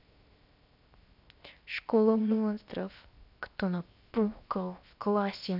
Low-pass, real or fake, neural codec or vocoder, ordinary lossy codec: 5.4 kHz; fake; codec, 16 kHz, 0.7 kbps, FocalCodec; none